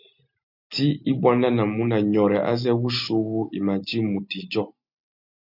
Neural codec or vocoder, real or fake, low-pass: none; real; 5.4 kHz